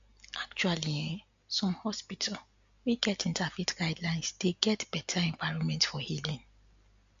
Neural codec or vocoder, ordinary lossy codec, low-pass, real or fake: none; AAC, 64 kbps; 7.2 kHz; real